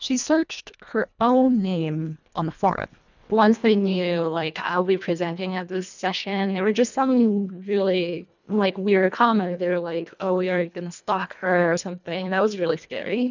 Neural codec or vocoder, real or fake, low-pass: codec, 24 kHz, 1.5 kbps, HILCodec; fake; 7.2 kHz